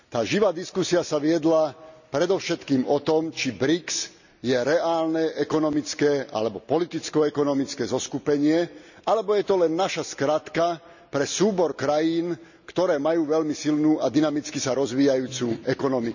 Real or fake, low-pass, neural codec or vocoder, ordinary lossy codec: real; 7.2 kHz; none; none